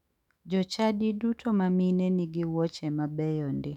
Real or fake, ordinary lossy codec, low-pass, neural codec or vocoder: fake; none; 19.8 kHz; autoencoder, 48 kHz, 128 numbers a frame, DAC-VAE, trained on Japanese speech